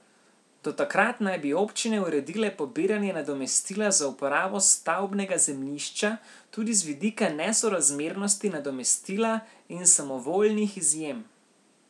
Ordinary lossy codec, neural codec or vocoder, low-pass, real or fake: none; none; none; real